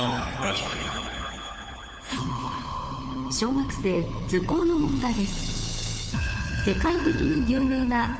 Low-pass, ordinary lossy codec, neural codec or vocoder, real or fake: none; none; codec, 16 kHz, 4 kbps, FunCodec, trained on LibriTTS, 50 frames a second; fake